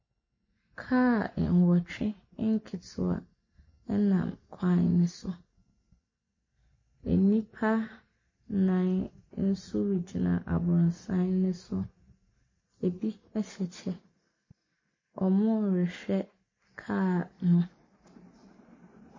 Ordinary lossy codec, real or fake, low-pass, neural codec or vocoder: MP3, 32 kbps; real; 7.2 kHz; none